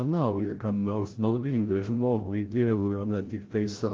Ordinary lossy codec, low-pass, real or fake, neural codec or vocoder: Opus, 16 kbps; 7.2 kHz; fake; codec, 16 kHz, 0.5 kbps, FreqCodec, larger model